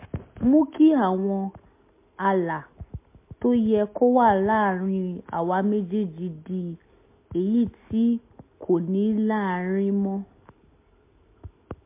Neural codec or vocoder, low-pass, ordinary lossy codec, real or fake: none; 3.6 kHz; MP3, 24 kbps; real